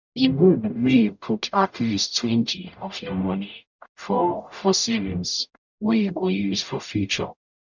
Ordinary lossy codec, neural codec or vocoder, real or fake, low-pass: none; codec, 44.1 kHz, 0.9 kbps, DAC; fake; 7.2 kHz